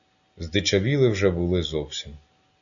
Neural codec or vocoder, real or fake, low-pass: none; real; 7.2 kHz